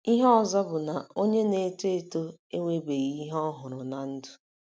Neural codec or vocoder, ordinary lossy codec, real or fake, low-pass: none; none; real; none